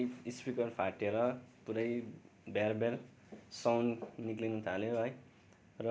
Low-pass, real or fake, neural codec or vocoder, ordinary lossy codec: none; real; none; none